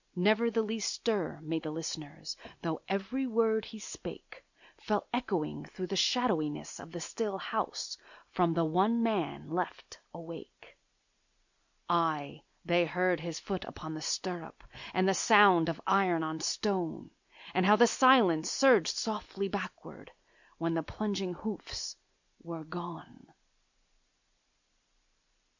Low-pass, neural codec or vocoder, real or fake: 7.2 kHz; none; real